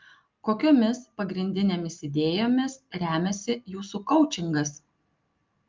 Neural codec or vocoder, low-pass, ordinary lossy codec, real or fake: none; 7.2 kHz; Opus, 24 kbps; real